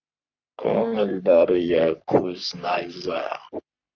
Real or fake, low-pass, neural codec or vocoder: fake; 7.2 kHz; codec, 44.1 kHz, 3.4 kbps, Pupu-Codec